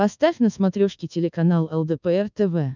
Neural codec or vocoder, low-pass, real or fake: codec, 24 kHz, 1.2 kbps, DualCodec; 7.2 kHz; fake